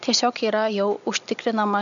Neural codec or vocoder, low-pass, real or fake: none; 7.2 kHz; real